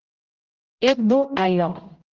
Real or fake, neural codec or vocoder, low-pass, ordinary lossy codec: fake; codec, 16 kHz, 0.5 kbps, X-Codec, HuBERT features, trained on general audio; 7.2 kHz; Opus, 32 kbps